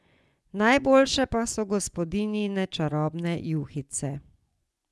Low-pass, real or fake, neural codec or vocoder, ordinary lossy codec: none; fake; vocoder, 24 kHz, 100 mel bands, Vocos; none